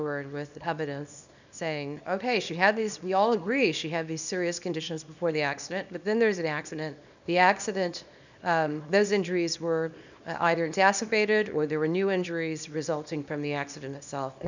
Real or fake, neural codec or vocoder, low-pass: fake; codec, 24 kHz, 0.9 kbps, WavTokenizer, small release; 7.2 kHz